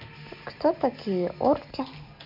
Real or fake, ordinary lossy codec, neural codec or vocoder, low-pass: real; none; none; 5.4 kHz